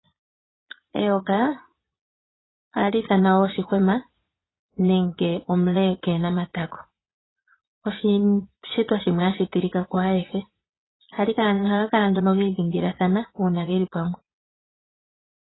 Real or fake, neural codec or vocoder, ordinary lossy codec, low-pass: fake; vocoder, 22.05 kHz, 80 mel bands, Vocos; AAC, 16 kbps; 7.2 kHz